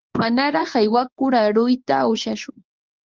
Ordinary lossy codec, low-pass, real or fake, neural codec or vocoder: Opus, 32 kbps; 7.2 kHz; fake; codec, 24 kHz, 0.9 kbps, WavTokenizer, medium speech release version 1